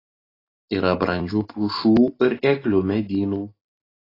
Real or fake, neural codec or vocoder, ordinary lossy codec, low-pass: real; none; AAC, 24 kbps; 5.4 kHz